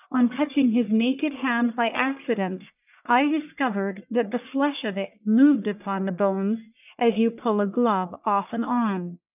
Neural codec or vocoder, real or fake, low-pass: codec, 44.1 kHz, 1.7 kbps, Pupu-Codec; fake; 3.6 kHz